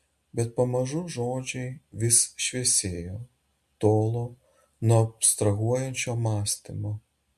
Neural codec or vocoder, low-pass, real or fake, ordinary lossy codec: none; 14.4 kHz; real; MP3, 64 kbps